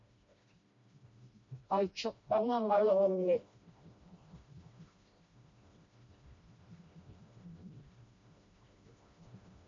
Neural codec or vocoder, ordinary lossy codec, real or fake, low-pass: codec, 16 kHz, 1 kbps, FreqCodec, smaller model; MP3, 48 kbps; fake; 7.2 kHz